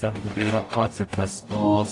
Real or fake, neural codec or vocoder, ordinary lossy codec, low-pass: fake; codec, 44.1 kHz, 0.9 kbps, DAC; AAC, 48 kbps; 10.8 kHz